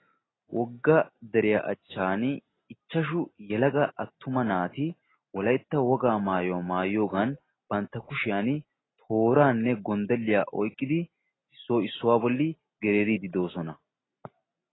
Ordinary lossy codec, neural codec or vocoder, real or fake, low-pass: AAC, 16 kbps; none; real; 7.2 kHz